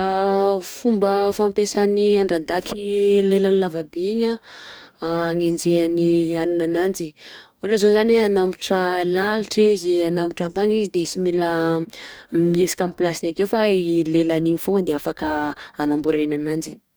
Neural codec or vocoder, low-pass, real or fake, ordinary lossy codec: codec, 44.1 kHz, 2.6 kbps, DAC; none; fake; none